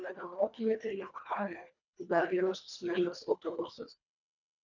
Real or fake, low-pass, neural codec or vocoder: fake; 7.2 kHz; codec, 24 kHz, 1.5 kbps, HILCodec